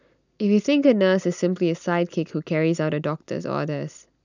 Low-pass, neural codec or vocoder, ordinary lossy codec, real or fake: 7.2 kHz; none; none; real